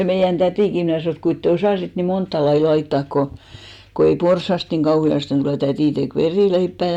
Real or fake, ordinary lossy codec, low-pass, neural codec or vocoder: real; none; 19.8 kHz; none